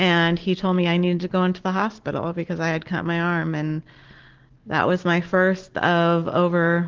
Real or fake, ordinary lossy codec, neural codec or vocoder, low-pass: real; Opus, 16 kbps; none; 7.2 kHz